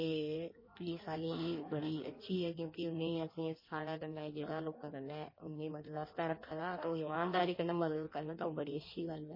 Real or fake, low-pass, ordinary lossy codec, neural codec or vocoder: fake; 5.4 kHz; MP3, 24 kbps; codec, 16 kHz in and 24 kHz out, 1.1 kbps, FireRedTTS-2 codec